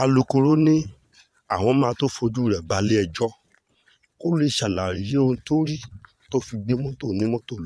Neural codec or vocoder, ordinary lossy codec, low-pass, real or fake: vocoder, 22.05 kHz, 80 mel bands, Vocos; none; none; fake